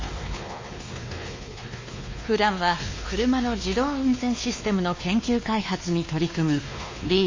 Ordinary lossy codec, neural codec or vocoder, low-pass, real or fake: MP3, 32 kbps; codec, 16 kHz, 2 kbps, X-Codec, WavLM features, trained on Multilingual LibriSpeech; 7.2 kHz; fake